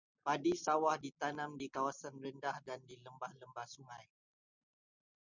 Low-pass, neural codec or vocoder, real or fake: 7.2 kHz; none; real